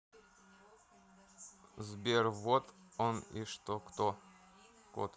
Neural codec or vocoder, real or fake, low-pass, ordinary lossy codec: none; real; none; none